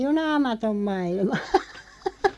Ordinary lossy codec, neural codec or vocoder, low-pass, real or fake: none; none; none; real